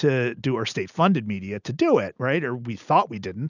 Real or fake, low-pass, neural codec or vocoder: real; 7.2 kHz; none